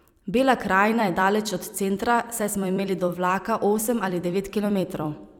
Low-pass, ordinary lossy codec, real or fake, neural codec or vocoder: 19.8 kHz; none; fake; vocoder, 44.1 kHz, 128 mel bands every 256 samples, BigVGAN v2